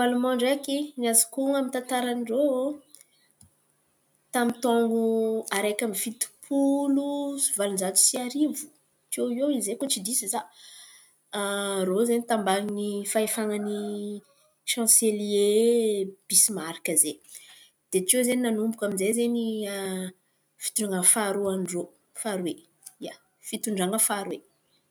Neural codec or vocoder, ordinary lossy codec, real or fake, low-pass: none; none; real; none